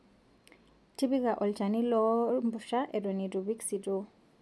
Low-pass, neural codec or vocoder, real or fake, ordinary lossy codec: none; none; real; none